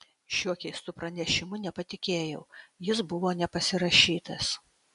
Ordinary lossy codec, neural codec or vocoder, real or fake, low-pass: AAC, 96 kbps; none; real; 10.8 kHz